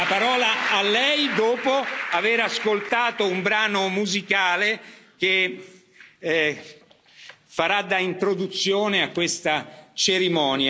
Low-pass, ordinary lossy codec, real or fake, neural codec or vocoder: none; none; real; none